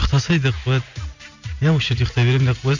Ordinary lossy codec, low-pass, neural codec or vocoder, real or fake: Opus, 64 kbps; 7.2 kHz; none; real